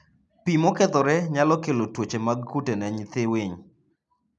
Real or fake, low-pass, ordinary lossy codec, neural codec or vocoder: real; 10.8 kHz; none; none